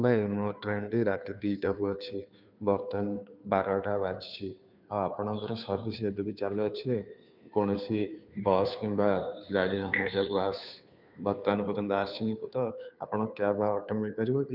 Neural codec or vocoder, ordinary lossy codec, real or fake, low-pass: codec, 16 kHz, 2 kbps, FunCodec, trained on Chinese and English, 25 frames a second; none; fake; 5.4 kHz